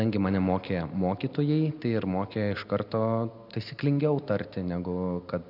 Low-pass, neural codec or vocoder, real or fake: 5.4 kHz; none; real